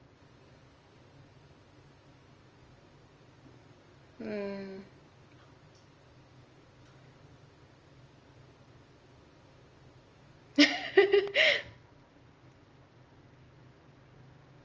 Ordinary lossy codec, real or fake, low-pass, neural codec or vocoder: Opus, 24 kbps; real; 7.2 kHz; none